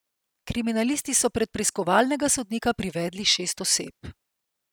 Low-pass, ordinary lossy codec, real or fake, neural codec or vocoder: none; none; real; none